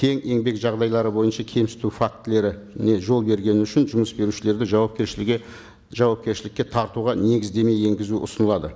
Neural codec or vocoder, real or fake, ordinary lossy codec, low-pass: none; real; none; none